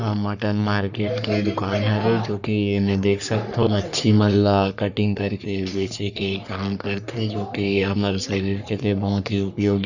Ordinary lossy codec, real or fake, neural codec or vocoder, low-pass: none; fake; codec, 44.1 kHz, 3.4 kbps, Pupu-Codec; 7.2 kHz